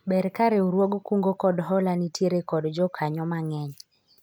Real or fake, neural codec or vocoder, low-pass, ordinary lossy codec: real; none; none; none